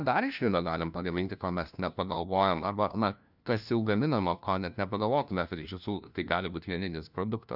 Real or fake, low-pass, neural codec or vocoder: fake; 5.4 kHz; codec, 16 kHz, 1 kbps, FunCodec, trained on LibriTTS, 50 frames a second